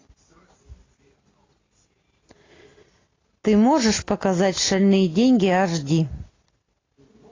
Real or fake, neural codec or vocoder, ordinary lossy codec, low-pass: real; none; AAC, 32 kbps; 7.2 kHz